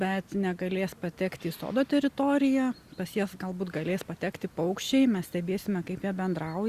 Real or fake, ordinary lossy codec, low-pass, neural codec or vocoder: real; Opus, 64 kbps; 14.4 kHz; none